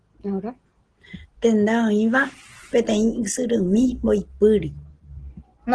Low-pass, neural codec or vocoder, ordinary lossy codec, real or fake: 9.9 kHz; none; Opus, 16 kbps; real